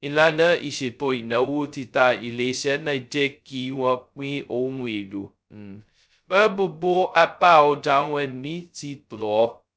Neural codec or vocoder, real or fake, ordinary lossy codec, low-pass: codec, 16 kHz, 0.2 kbps, FocalCodec; fake; none; none